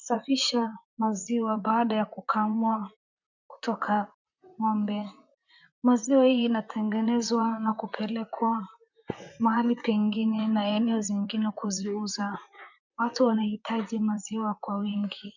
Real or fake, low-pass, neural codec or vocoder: fake; 7.2 kHz; vocoder, 44.1 kHz, 128 mel bands, Pupu-Vocoder